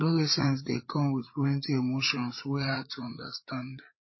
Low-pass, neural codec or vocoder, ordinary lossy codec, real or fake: 7.2 kHz; codec, 16 kHz, 8 kbps, FreqCodec, larger model; MP3, 24 kbps; fake